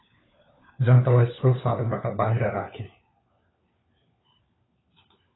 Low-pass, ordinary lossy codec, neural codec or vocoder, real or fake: 7.2 kHz; AAC, 16 kbps; codec, 16 kHz, 4 kbps, FunCodec, trained on LibriTTS, 50 frames a second; fake